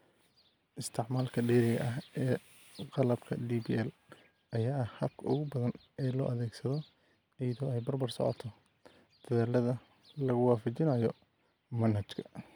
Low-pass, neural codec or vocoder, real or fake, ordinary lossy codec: none; none; real; none